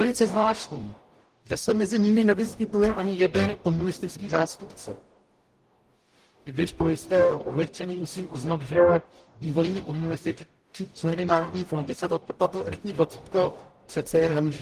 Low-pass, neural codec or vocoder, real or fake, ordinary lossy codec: 14.4 kHz; codec, 44.1 kHz, 0.9 kbps, DAC; fake; Opus, 24 kbps